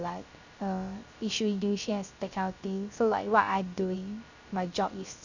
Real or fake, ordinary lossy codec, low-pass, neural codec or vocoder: fake; none; 7.2 kHz; codec, 16 kHz, 0.3 kbps, FocalCodec